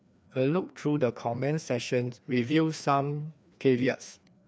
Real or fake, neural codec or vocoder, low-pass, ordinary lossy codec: fake; codec, 16 kHz, 2 kbps, FreqCodec, larger model; none; none